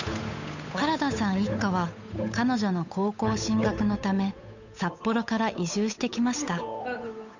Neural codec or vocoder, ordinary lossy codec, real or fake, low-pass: codec, 16 kHz, 8 kbps, FunCodec, trained on Chinese and English, 25 frames a second; none; fake; 7.2 kHz